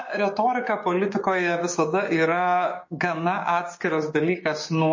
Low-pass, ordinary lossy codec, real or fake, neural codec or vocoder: 7.2 kHz; MP3, 32 kbps; fake; codec, 16 kHz, 6 kbps, DAC